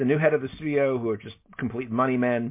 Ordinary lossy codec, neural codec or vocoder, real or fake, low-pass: MP3, 24 kbps; none; real; 3.6 kHz